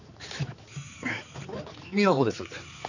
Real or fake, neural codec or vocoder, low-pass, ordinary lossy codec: fake; codec, 16 kHz, 4 kbps, X-Codec, HuBERT features, trained on balanced general audio; 7.2 kHz; none